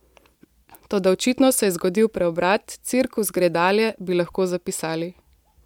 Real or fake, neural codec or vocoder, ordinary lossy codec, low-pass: real; none; MP3, 96 kbps; 19.8 kHz